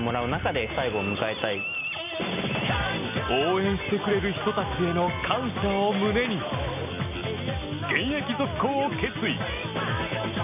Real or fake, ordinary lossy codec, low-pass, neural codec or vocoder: real; none; 3.6 kHz; none